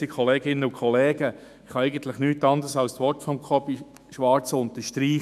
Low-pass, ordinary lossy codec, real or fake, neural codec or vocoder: 14.4 kHz; none; fake; autoencoder, 48 kHz, 128 numbers a frame, DAC-VAE, trained on Japanese speech